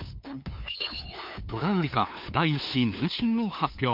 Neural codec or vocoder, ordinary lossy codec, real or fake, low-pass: codec, 16 kHz, 2 kbps, FunCodec, trained on LibriTTS, 25 frames a second; MP3, 48 kbps; fake; 5.4 kHz